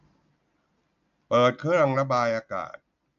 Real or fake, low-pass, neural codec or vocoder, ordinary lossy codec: real; 7.2 kHz; none; AAC, 64 kbps